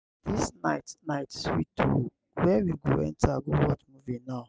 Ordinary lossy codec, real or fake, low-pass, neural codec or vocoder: none; real; none; none